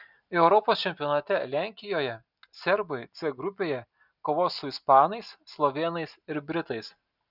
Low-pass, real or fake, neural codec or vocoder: 5.4 kHz; real; none